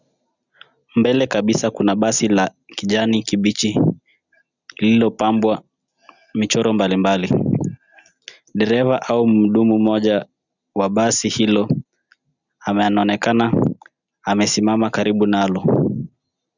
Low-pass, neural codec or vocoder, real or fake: 7.2 kHz; none; real